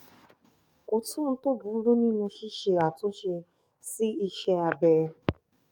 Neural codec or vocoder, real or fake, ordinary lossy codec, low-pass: codec, 44.1 kHz, 7.8 kbps, DAC; fake; MP3, 96 kbps; 19.8 kHz